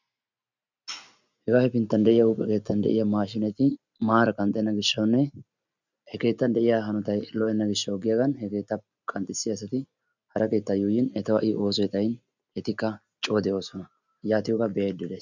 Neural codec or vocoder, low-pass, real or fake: none; 7.2 kHz; real